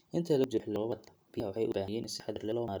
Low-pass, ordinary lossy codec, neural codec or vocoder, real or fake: none; none; none; real